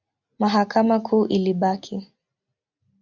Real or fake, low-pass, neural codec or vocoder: real; 7.2 kHz; none